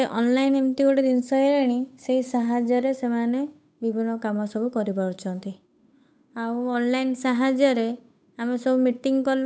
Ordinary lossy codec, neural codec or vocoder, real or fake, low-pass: none; codec, 16 kHz, 8 kbps, FunCodec, trained on Chinese and English, 25 frames a second; fake; none